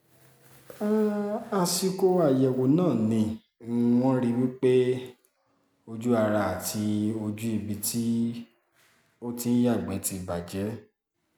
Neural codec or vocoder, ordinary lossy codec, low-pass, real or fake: none; none; none; real